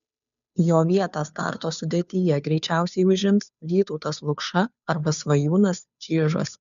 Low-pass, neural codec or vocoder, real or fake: 7.2 kHz; codec, 16 kHz, 2 kbps, FunCodec, trained on Chinese and English, 25 frames a second; fake